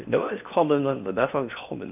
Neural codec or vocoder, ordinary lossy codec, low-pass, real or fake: codec, 16 kHz in and 24 kHz out, 0.6 kbps, FocalCodec, streaming, 4096 codes; none; 3.6 kHz; fake